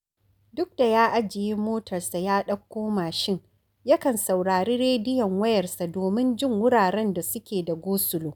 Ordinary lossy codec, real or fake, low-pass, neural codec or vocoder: none; real; none; none